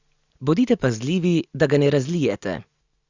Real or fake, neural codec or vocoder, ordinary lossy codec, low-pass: real; none; Opus, 64 kbps; 7.2 kHz